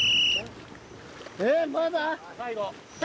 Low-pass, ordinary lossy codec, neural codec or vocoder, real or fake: none; none; none; real